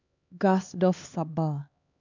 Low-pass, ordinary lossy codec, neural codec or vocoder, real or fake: 7.2 kHz; none; codec, 16 kHz, 2 kbps, X-Codec, HuBERT features, trained on LibriSpeech; fake